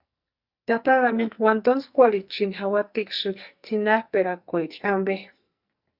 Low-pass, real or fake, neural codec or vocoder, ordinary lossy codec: 5.4 kHz; fake; codec, 44.1 kHz, 2.6 kbps, SNAC; Opus, 64 kbps